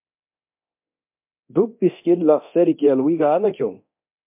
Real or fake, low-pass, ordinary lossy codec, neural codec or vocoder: fake; 3.6 kHz; MP3, 32 kbps; codec, 24 kHz, 0.9 kbps, DualCodec